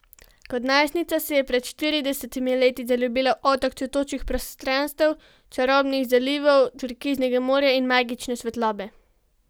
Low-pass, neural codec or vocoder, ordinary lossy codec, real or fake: none; none; none; real